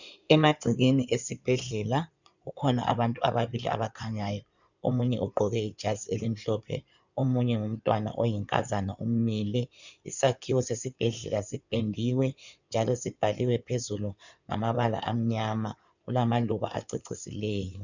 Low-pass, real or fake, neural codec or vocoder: 7.2 kHz; fake; codec, 16 kHz in and 24 kHz out, 2.2 kbps, FireRedTTS-2 codec